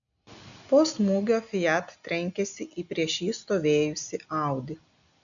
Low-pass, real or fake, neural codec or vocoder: 7.2 kHz; real; none